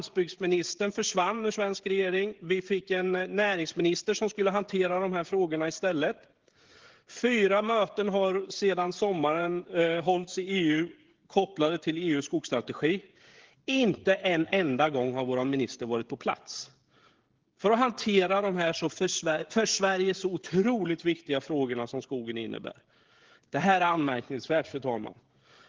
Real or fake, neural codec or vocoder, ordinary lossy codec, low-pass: fake; codec, 16 kHz, 16 kbps, FreqCodec, smaller model; Opus, 16 kbps; 7.2 kHz